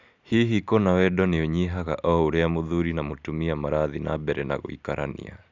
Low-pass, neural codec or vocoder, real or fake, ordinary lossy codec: 7.2 kHz; none; real; none